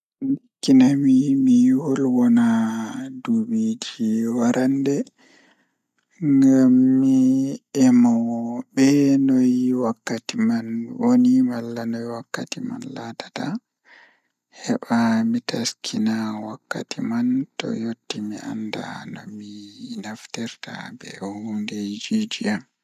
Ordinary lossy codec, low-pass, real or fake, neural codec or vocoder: none; 14.4 kHz; real; none